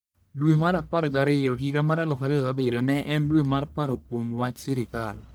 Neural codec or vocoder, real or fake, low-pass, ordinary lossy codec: codec, 44.1 kHz, 1.7 kbps, Pupu-Codec; fake; none; none